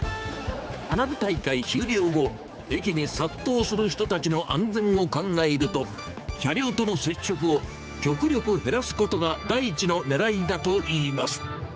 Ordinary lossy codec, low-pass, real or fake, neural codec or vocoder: none; none; fake; codec, 16 kHz, 4 kbps, X-Codec, HuBERT features, trained on general audio